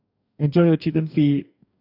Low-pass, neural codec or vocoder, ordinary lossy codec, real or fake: 5.4 kHz; codec, 44.1 kHz, 2.6 kbps, DAC; none; fake